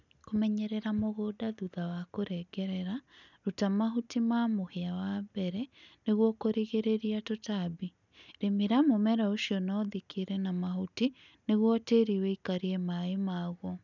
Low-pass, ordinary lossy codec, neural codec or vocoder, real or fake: 7.2 kHz; none; none; real